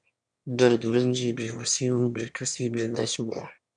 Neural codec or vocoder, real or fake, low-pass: autoencoder, 22.05 kHz, a latent of 192 numbers a frame, VITS, trained on one speaker; fake; 9.9 kHz